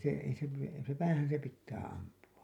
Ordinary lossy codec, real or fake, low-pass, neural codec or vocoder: none; real; 19.8 kHz; none